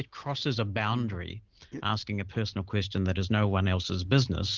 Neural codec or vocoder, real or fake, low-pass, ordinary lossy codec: none; real; 7.2 kHz; Opus, 16 kbps